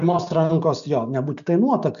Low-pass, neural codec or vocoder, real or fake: 7.2 kHz; none; real